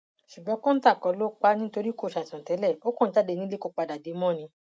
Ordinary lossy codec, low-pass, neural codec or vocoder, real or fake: none; none; none; real